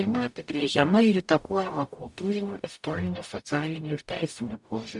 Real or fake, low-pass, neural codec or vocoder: fake; 10.8 kHz; codec, 44.1 kHz, 0.9 kbps, DAC